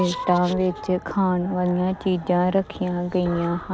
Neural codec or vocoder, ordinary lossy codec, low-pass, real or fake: none; none; none; real